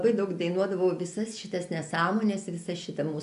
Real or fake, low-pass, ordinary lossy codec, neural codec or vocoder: real; 10.8 kHz; AAC, 96 kbps; none